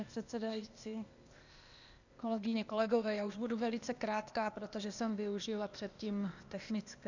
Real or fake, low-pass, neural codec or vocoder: fake; 7.2 kHz; codec, 16 kHz, 0.8 kbps, ZipCodec